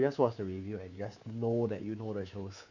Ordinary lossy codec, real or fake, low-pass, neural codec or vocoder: none; fake; 7.2 kHz; codec, 24 kHz, 3.1 kbps, DualCodec